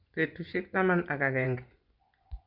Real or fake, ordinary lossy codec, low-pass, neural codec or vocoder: fake; Opus, 64 kbps; 5.4 kHz; vocoder, 24 kHz, 100 mel bands, Vocos